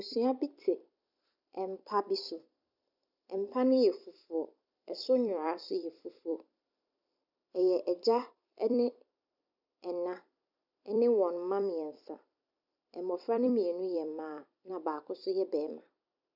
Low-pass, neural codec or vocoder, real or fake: 5.4 kHz; vocoder, 44.1 kHz, 128 mel bands every 256 samples, BigVGAN v2; fake